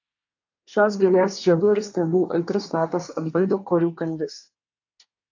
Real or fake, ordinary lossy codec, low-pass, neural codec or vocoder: fake; AAC, 48 kbps; 7.2 kHz; codec, 24 kHz, 1 kbps, SNAC